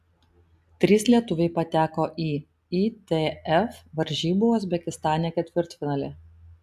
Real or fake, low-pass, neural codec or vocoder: real; 14.4 kHz; none